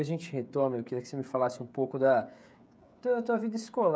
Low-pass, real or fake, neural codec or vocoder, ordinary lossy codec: none; fake; codec, 16 kHz, 16 kbps, FreqCodec, smaller model; none